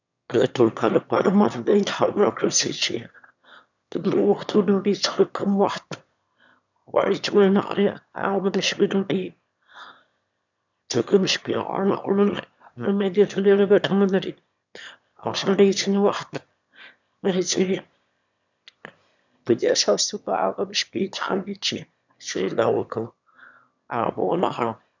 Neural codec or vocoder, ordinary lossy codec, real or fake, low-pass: autoencoder, 22.05 kHz, a latent of 192 numbers a frame, VITS, trained on one speaker; none; fake; 7.2 kHz